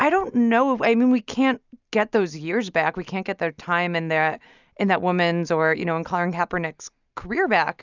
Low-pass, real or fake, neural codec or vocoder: 7.2 kHz; real; none